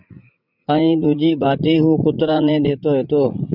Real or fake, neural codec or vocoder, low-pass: fake; vocoder, 44.1 kHz, 80 mel bands, Vocos; 5.4 kHz